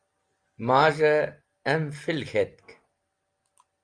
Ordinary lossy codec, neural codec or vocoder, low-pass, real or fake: Opus, 32 kbps; none; 9.9 kHz; real